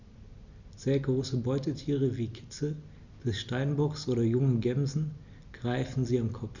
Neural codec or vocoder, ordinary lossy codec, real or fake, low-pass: none; none; real; 7.2 kHz